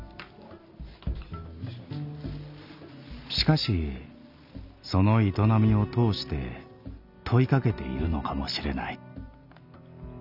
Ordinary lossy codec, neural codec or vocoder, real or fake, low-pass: none; none; real; 5.4 kHz